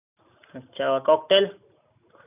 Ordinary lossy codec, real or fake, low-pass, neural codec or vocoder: none; real; 3.6 kHz; none